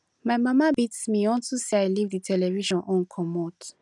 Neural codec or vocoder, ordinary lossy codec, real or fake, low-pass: none; none; real; 10.8 kHz